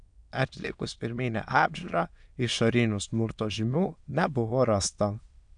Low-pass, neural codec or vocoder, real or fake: 9.9 kHz; autoencoder, 22.05 kHz, a latent of 192 numbers a frame, VITS, trained on many speakers; fake